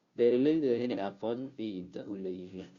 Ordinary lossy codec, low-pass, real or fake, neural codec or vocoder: Opus, 64 kbps; 7.2 kHz; fake; codec, 16 kHz, 0.5 kbps, FunCodec, trained on Chinese and English, 25 frames a second